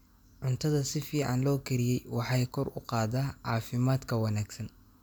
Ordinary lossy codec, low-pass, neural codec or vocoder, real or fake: none; none; none; real